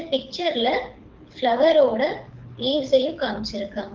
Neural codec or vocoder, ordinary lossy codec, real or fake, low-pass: codec, 24 kHz, 6 kbps, HILCodec; Opus, 32 kbps; fake; 7.2 kHz